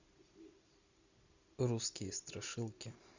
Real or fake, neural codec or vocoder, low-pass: real; none; 7.2 kHz